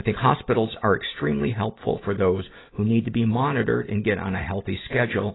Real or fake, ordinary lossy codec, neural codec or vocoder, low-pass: real; AAC, 16 kbps; none; 7.2 kHz